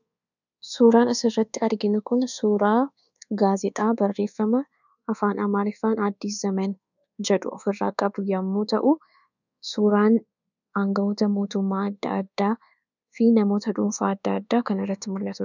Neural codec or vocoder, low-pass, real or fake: codec, 24 kHz, 1.2 kbps, DualCodec; 7.2 kHz; fake